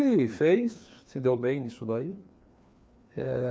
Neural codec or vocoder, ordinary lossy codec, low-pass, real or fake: codec, 16 kHz, 2 kbps, FreqCodec, larger model; none; none; fake